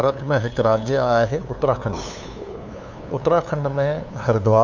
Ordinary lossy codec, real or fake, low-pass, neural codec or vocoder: none; fake; 7.2 kHz; codec, 16 kHz, 2 kbps, FunCodec, trained on LibriTTS, 25 frames a second